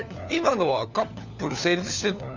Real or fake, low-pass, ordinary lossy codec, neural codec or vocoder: fake; 7.2 kHz; none; codec, 16 kHz, 4 kbps, FunCodec, trained on LibriTTS, 50 frames a second